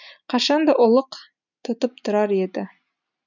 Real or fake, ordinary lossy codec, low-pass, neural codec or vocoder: real; none; 7.2 kHz; none